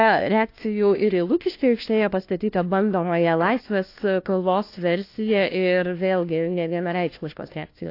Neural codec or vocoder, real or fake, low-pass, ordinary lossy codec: codec, 16 kHz, 1 kbps, FunCodec, trained on LibriTTS, 50 frames a second; fake; 5.4 kHz; AAC, 32 kbps